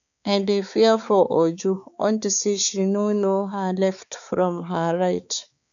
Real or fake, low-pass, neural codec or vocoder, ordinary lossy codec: fake; 7.2 kHz; codec, 16 kHz, 4 kbps, X-Codec, HuBERT features, trained on balanced general audio; none